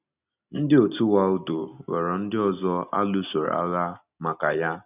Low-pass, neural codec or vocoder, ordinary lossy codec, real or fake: 3.6 kHz; none; none; real